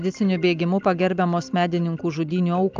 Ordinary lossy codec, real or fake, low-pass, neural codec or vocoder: Opus, 24 kbps; real; 7.2 kHz; none